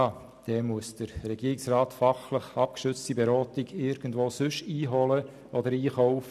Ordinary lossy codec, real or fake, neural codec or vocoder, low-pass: none; real; none; 14.4 kHz